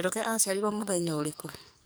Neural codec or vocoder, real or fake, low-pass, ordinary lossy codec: codec, 44.1 kHz, 2.6 kbps, SNAC; fake; none; none